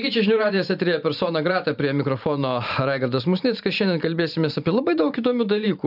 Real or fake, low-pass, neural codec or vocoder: fake; 5.4 kHz; vocoder, 44.1 kHz, 128 mel bands every 512 samples, BigVGAN v2